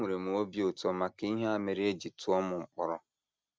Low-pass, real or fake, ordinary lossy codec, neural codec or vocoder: none; real; none; none